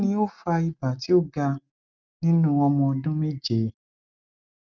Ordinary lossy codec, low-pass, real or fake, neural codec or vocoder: none; 7.2 kHz; real; none